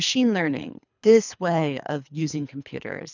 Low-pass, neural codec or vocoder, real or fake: 7.2 kHz; codec, 24 kHz, 3 kbps, HILCodec; fake